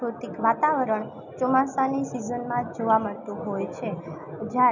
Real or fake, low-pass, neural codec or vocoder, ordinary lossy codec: real; 7.2 kHz; none; none